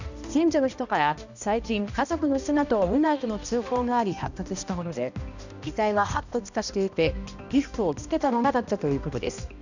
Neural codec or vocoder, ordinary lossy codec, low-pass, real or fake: codec, 16 kHz, 1 kbps, X-Codec, HuBERT features, trained on balanced general audio; none; 7.2 kHz; fake